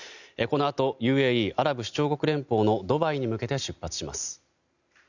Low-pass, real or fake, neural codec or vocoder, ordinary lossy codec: 7.2 kHz; real; none; none